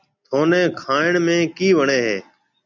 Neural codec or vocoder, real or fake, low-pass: none; real; 7.2 kHz